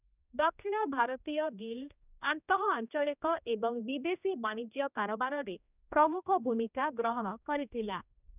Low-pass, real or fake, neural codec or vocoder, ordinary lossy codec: 3.6 kHz; fake; codec, 16 kHz, 1 kbps, X-Codec, HuBERT features, trained on general audio; none